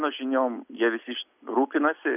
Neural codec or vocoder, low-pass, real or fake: none; 3.6 kHz; real